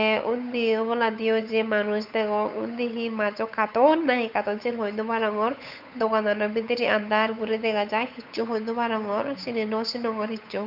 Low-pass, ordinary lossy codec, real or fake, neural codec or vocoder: 5.4 kHz; none; fake; codec, 16 kHz, 8 kbps, FunCodec, trained on Chinese and English, 25 frames a second